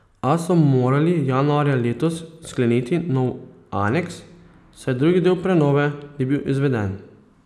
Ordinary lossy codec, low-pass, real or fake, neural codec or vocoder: none; none; real; none